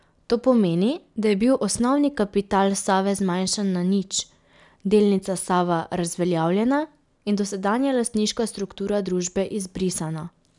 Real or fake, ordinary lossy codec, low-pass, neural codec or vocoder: real; none; 10.8 kHz; none